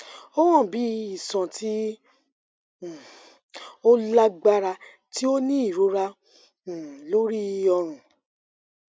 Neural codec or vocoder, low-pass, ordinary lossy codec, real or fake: none; none; none; real